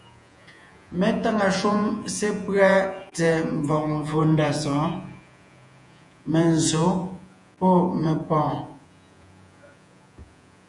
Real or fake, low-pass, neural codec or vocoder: fake; 10.8 kHz; vocoder, 48 kHz, 128 mel bands, Vocos